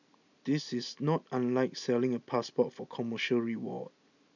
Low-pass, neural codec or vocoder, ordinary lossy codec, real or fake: 7.2 kHz; none; none; real